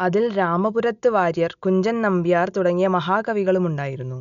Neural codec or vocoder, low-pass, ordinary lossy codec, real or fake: none; 7.2 kHz; none; real